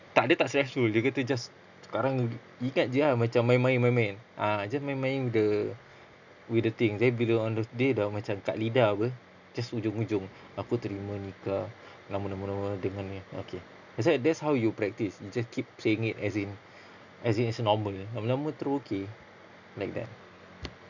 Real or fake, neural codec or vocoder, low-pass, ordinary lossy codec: real; none; 7.2 kHz; none